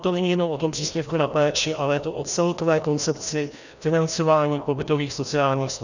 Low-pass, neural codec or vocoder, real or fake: 7.2 kHz; codec, 16 kHz, 1 kbps, FreqCodec, larger model; fake